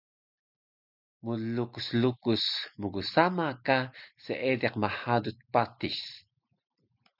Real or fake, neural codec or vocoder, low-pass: real; none; 5.4 kHz